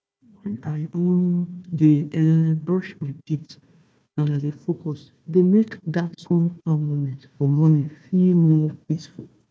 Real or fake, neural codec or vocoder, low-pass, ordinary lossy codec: fake; codec, 16 kHz, 1 kbps, FunCodec, trained on Chinese and English, 50 frames a second; none; none